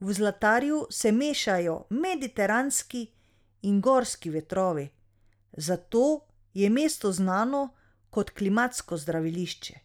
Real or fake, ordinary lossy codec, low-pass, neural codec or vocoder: real; none; 19.8 kHz; none